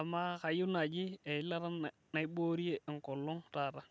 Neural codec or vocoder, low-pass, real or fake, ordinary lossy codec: none; none; real; none